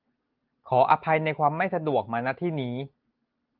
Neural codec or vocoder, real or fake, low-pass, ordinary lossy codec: none; real; 5.4 kHz; Opus, 32 kbps